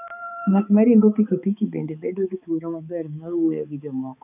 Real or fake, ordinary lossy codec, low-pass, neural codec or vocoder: fake; none; 3.6 kHz; codec, 16 kHz, 4 kbps, X-Codec, HuBERT features, trained on balanced general audio